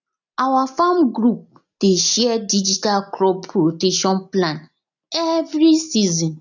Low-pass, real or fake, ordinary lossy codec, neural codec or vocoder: 7.2 kHz; real; none; none